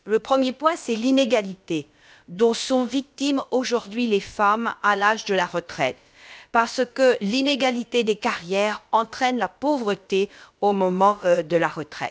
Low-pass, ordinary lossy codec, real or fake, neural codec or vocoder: none; none; fake; codec, 16 kHz, about 1 kbps, DyCAST, with the encoder's durations